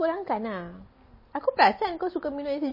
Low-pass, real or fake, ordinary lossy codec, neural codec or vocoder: 5.4 kHz; real; MP3, 24 kbps; none